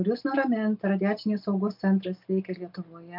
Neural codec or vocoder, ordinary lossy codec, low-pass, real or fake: none; MP3, 48 kbps; 5.4 kHz; real